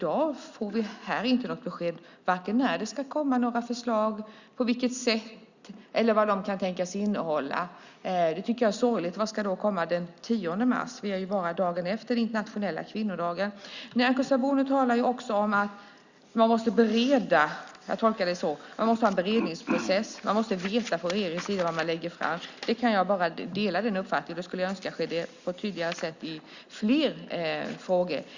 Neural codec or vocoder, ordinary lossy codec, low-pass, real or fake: none; Opus, 64 kbps; 7.2 kHz; real